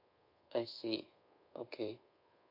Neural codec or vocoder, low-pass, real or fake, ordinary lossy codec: codec, 24 kHz, 1.2 kbps, DualCodec; 5.4 kHz; fake; MP3, 32 kbps